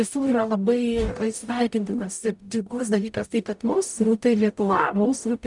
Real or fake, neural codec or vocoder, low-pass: fake; codec, 44.1 kHz, 0.9 kbps, DAC; 10.8 kHz